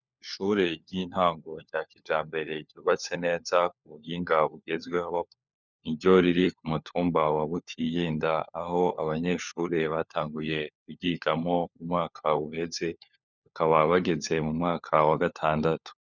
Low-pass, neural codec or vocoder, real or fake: 7.2 kHz; codec, 16 kHz, 4 kbps, FunCodec, trained on LibriTTS, 50 frames a second; fake